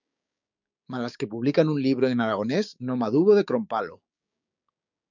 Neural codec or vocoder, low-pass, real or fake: codec, 16 kHz, 6 kbps, DAC; 7.2 kHz; fake